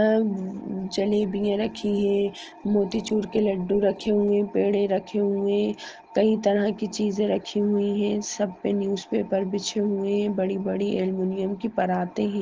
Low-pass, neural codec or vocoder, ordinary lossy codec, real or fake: 7.2 kHz; none; Opus, 16 kbps; real